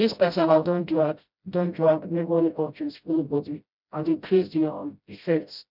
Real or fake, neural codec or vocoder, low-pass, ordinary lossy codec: fake; codec, 16 kHz, 0.5 kbps, FreqCodec, smaller model; 5.4 kHz; none